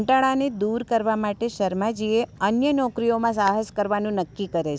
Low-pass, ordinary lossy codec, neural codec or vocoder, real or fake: none; none; none; real